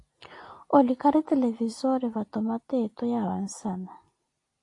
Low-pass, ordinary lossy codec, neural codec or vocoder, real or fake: 10.8 kHz; AAC, 48 kbps; none; real